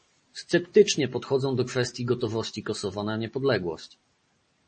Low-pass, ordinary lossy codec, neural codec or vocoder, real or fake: 10.8 kHz; MP3, 32 kbps; none; real